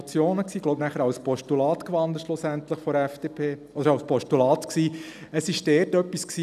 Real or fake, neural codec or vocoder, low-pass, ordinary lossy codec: real; none; 14.4 kHz; none